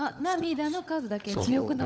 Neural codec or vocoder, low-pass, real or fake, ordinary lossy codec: codec, 16 kHz, 16 kbps, FunCodec, trained on LibriTTS, 50 frames a second; none; fake; none